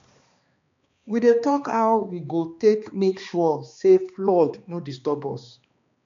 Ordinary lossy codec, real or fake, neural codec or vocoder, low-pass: MP3, 64 kbps; fake; codec, 16 kHz, 2 kbps, X-Codec, HuBERT features, trained on balanced general audio; 7.2 kHz